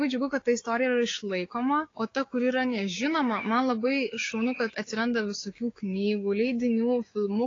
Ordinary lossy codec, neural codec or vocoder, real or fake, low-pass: AAC, 32 kbps; codec, 16 kHz, 6 kbps, DAC; fake; 7.2 kHz